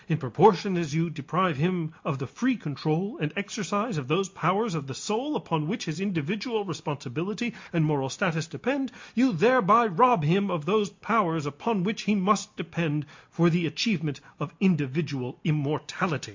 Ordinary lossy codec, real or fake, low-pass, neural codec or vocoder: MP3, 48 kbps; real; 7.2 kHz; none